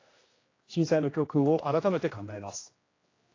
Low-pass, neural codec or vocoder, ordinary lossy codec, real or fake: 7.2 kHz; codec, 16 kHz, 1 kbps, X-Codec, HuBERT features, trained on general audio; AAC, 32 kbps; fake